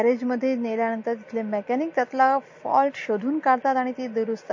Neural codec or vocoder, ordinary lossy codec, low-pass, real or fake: none; MP3, 32 kbps; 7.2 kHz; real